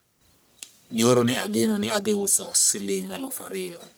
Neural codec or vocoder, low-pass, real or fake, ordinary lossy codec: codec, 44.1 kHz, 1.7 kbps, Pupu-Codec; none; fake; none